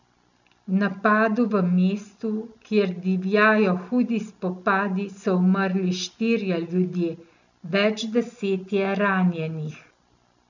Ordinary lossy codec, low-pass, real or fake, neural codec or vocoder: none; 7.2 kHz; real; none